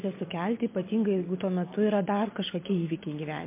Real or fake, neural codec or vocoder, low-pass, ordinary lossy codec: real; none; 3.6 kHz; MP3, 24 kbps